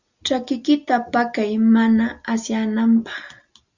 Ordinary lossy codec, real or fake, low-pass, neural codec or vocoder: Opus, 64 kbps; real; 7.2 kHz; none